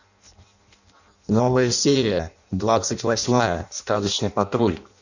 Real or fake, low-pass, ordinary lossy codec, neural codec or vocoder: fake; 7.2 kHz; none; codec, 16 kHz in and 24 kHz out, 0.6 kbps, FireRedTTS-2 codec